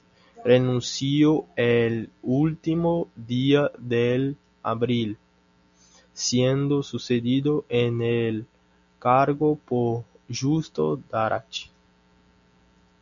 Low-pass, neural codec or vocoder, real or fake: 7.2 kHz; none; real